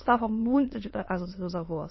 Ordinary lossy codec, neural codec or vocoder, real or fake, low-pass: MP3, 24 kbps; autoencoder, 22.05 kHz, a latent of 192 numbers a frame, VITS, trained on many speakers; fake; 7.2 kHz